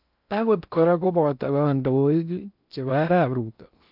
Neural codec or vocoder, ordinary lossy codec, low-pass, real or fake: codec, 16 kHz in and 24 kHz out, 0.6 kbps, FocalCodec, streaming, 4096 codes; none; 5.4 kHz; fake